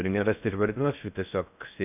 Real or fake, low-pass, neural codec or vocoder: fake; 3.6 kHz; codec, 16 kHz in and 24 kHz out, 0.6 kbps, FocalCodec, streaming, 4096 codes